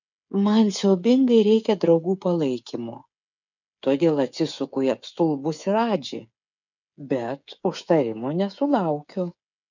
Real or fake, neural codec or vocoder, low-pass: fake; codec, 16 kHz, 8 kbps, FreqCodec, smaller model; 7.2 kHz